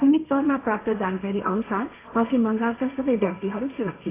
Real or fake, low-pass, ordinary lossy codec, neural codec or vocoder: fake; 3.6 kHz; AAC, 16 kbps; codec, 16 kHz, 1.1 kbps, Voila-Tokenizer